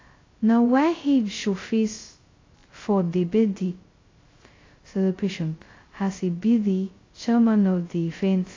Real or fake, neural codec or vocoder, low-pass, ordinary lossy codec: fake; codec, 16 kHz, 0.2 kbps, FocalCodec; 7.2 kHz; AAC, 32 kbps